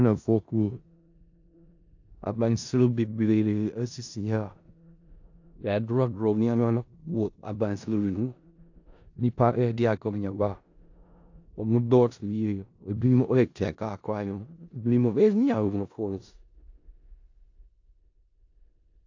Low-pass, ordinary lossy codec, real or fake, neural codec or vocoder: 7.2 kHz; AAC, 48 kbps; fake; codec, 16 kHz in and 24 kHz out, 0.4 kbps, LongCat-Audio-Codec, four codebook decoder